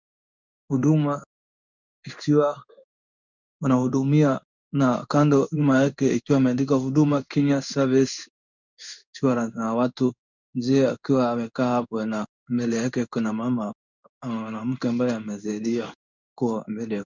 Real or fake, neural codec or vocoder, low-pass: fake; codec, 16 kHz in and 24 kHz out, 1 kbps, XY-Tokenizer; 7.2 kHz